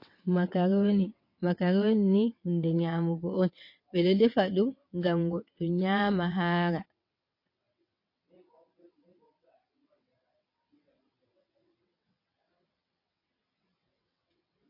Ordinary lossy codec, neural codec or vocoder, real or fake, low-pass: MP3, 32 kbps; vocoder, 22.05 kHz, 80 mel bands, WaveNeXt; fake; 5.4 kHz